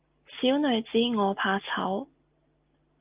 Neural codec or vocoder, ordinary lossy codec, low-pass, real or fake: none; Opus, 32 kbps; 3.6 kHz; real